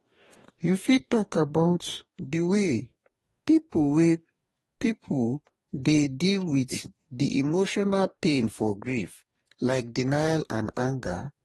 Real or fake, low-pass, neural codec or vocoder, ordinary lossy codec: fake; 19.8 kHz; codec, 44.1 kHz, 2.6 kbps, DAC; AAC, 32 kbps